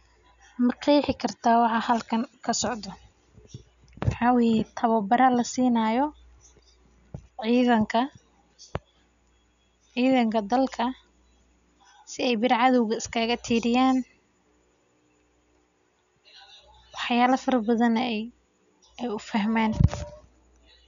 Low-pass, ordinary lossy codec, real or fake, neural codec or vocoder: 7.2 kHz; none; real; none